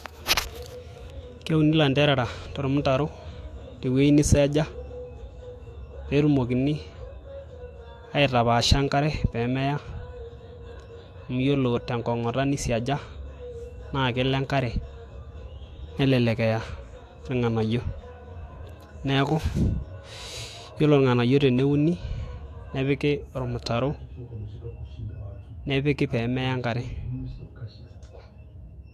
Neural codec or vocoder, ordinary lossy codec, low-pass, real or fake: autoencoder, 48 kHz, 128 numbers a frame, DAC-VAE, trained on Japanese speech; AAC, 64 kbps; 14.4 kHz; fake